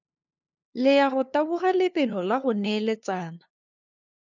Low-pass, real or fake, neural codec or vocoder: 7.2 kHz; fake; codec, 16 kHz, 2 kbps, FunCodec, trained on LibriTTS, 25 frames a second